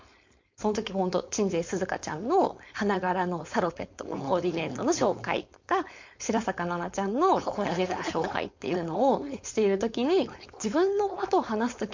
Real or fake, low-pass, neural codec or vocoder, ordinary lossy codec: fake; 7.2 kHz; codec, 16 kHz, 4.8 kbps, FACodec; MP3, 48 kbps